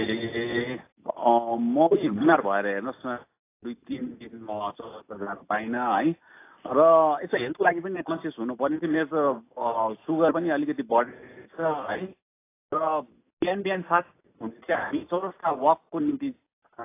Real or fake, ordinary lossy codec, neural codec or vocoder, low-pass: real; AAC, 24 kbps; none; 3.6 kHz